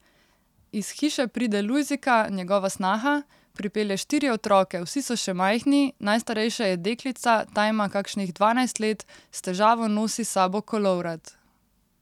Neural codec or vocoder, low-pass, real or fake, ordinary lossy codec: none; 19.8 kHz; real; none